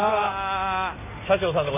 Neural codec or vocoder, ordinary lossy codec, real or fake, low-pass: none; none; real; 3.6 kHz